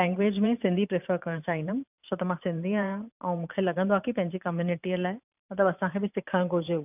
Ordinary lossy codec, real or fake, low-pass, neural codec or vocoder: none; fake; 3.6 kHz; vocoder, 44.1 kHz, 128 mel bands every 512 samples, BigVGAN v2